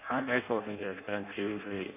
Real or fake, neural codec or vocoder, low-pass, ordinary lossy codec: fake; codec, 16 kHz in and 24 kHz out, 0.6 kbps, FireRedTTS-2 codec; 3.6 kHz; none